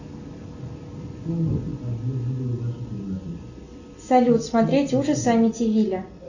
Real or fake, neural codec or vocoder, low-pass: real; none; 7.2 kHz